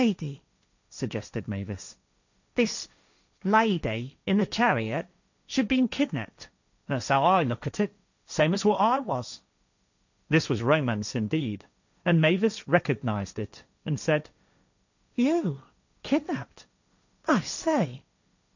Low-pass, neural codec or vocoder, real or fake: 7.2 kHz; codec, 16 kHz, 1.1 kbps, Voila-Tokenizer; fake